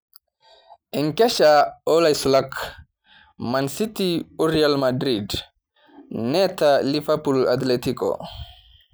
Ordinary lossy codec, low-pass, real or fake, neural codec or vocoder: none; none; real; none